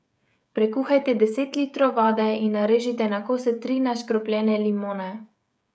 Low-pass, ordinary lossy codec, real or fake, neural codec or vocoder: none; none; fake; codec, 16 kHz, 16 kbps, FreqCodec, smaller model